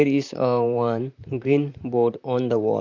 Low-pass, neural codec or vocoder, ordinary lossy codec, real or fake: 7.2 kHz; vocoder, 44.1 kHz, 128 mel bands, Pupu-Vocoder; none; fake